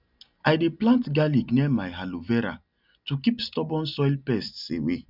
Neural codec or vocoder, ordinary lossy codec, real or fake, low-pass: none; none; real; 5.4 kHz